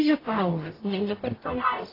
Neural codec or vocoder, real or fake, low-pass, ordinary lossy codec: codec, 44.1 kHz, 0.9 kbps, DAC; fake; 5.4 kHz; AAC, 24 kbps